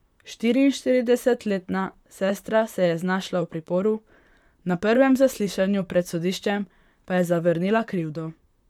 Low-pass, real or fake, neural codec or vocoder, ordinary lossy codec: 19.8 kHz; fake; vocoder, 44.1 kHz, 128 mel bands, Pupu-Vocoder; none